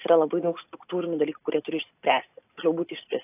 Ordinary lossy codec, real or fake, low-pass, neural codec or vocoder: AAC, 24 kbps; real; 3.6 kHz; none